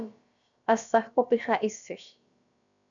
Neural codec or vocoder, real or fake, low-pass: codec, 16 kHz, about 1 kbps, DyCAST, with the encoder's durations; fake; 7.2 kHz